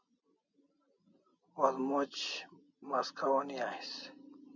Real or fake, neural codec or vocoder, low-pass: real; none; 7.2 kHz